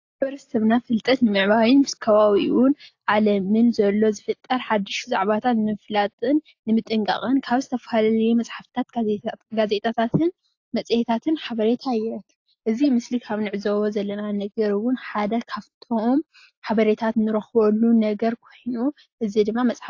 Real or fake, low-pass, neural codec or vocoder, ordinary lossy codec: real; 7.2 kHz; none; AAC, 48 kbps